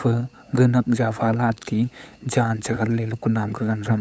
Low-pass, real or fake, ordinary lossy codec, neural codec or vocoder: none; fake; none; codec, 16 kHz, 8 kbps, FunCodec, trained on LibriTTS, 25 frames a second